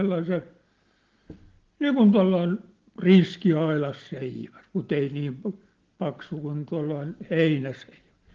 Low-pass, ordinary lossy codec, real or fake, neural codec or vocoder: 7.2 kHz; Opus, 16 kbps; real; none